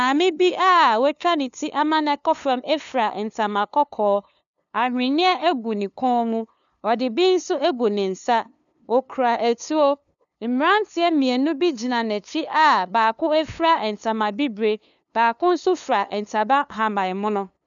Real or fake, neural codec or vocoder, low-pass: fake; codec, 16 kHz, 2 kbps, FunCodec, trained on LibriTTS, 25 frames a second; 7.2 kHz